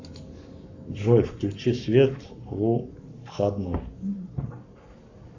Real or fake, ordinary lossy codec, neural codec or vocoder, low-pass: fake; Opus, 64 kbps; codec, 44.1 kHz, 7.8 kbps, Pupu-Codec; 7.2 kHz